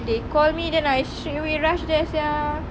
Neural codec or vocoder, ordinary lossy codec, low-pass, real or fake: none; none; none; real